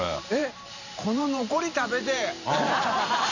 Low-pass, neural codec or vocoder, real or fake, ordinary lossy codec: 7.2 kHz; vocoder, 44.1 kHz, 128 mel bands every 512 samples, BigVGAN v2; fake; none